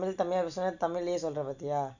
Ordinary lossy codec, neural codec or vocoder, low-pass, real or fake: none; none; 7.2 kHz; real